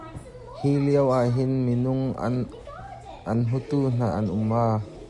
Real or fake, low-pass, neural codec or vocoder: real; 10.8 kHz; none